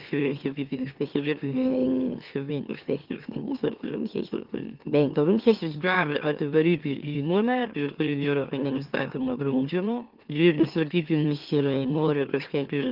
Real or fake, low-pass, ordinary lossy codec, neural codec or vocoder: fake; 5.4 kHz; Opus, 32 kbps; autoencoder, 44.1 kHz, a latent of 192 numbers a frame, MeloTTS